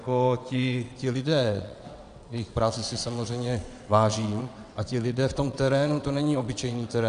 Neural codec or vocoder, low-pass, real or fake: vocoder, 22.05 kHz, 80 mel bands, WaveNeXt; 9.9 kHz; fake